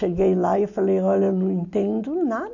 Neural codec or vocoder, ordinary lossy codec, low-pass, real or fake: none; none; 7.2 kHz; real